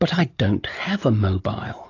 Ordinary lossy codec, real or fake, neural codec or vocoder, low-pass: AAC, 32 kbps; real; none; 7.2 kHz